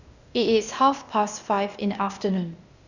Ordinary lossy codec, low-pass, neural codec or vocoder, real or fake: none; 7.2 kHz; codec, 16 kHz, 0.8 kbps, ZipCodec; fake